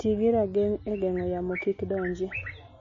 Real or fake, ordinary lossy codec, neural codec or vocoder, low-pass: real; MP3, 32 kbps; none; 7.2 kHz